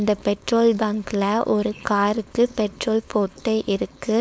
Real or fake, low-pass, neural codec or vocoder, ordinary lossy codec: fake; none; codec, 16 kHz, 4.8 kbps, FACodec; none